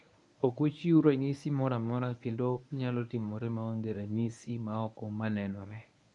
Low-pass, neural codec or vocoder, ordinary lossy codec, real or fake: 10.8 kHz; codec, 24 kHz, 0.9 kbps, WavTokenizer, medium speech release version 1; none; fake